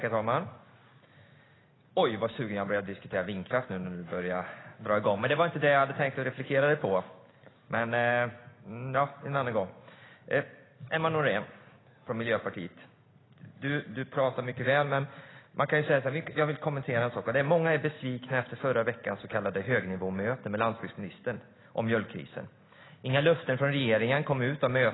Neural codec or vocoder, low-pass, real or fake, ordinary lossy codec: none; 7.2 kHz; real; AAC, 16 kbps